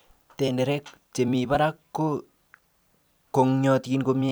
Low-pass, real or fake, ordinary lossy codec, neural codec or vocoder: none; fake; none; vocoder, 44.1 kHz, 128 mel bands every 256 samples, BigVGAN v2